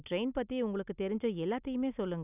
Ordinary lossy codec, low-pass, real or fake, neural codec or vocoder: none; 3.6 kHz; real; none